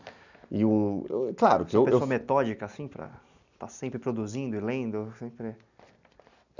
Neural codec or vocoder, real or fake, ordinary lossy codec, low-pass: none; real; none; 7.2 kHz